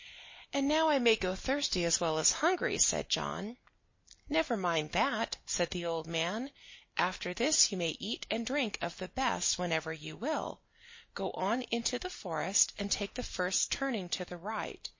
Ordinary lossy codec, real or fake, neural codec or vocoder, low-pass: MP3, 32 kbps; real; none; 7.2 kHz